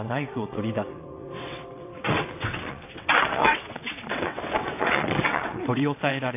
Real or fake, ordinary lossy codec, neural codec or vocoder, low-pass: fake; AAC, 24 kbps; vocoder, 22.05 kHz, 80 mel bands, WaveNeXt; 3.6 kHz